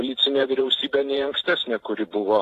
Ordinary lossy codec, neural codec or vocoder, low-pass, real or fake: AAC, 48 kbps; vocoder, 44.1 kHz, 128 mel bands every 512 samples, BigVGAN v2; 14.4 kHz; fake